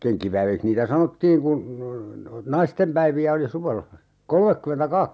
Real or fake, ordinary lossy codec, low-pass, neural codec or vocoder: real; none; none; none